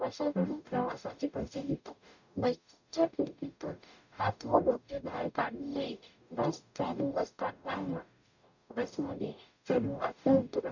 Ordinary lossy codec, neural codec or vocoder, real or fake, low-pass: none; codec, 44.1 kHz, 0.9 kbps, DAC; fake; 7.2 kHz